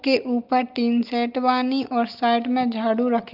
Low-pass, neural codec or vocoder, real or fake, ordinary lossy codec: 5.4 kHz; none; real; Opus, 32 kbps